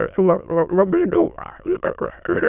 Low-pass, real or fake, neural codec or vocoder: 3.6 kHz; fake; autoencoder, 22.05 kHz, a latent of 192 numbers a frame, VITS, trained on many speakers